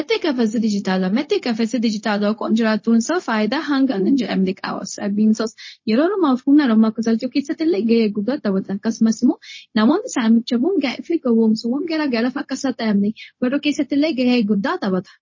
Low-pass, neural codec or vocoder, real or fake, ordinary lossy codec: 7.2 kHz; codec, 16 kHz, 0.4 kbps, LongCat-Audio-Codec; fake; MP3, 32 kbps